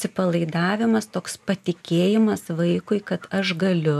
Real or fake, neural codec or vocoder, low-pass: real; none; 14.4 kHz